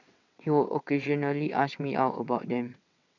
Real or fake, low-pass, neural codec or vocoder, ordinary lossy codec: fake; 7.2 kHz; vocoder, 22.05 kHz, 80 mel bands, WaveNeXt; none